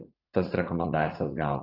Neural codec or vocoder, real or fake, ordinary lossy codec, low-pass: vocoder, 44.1 kHz, 80 mel bands, Vocos; fake; AAC, 24 kbps; 5.4 kHz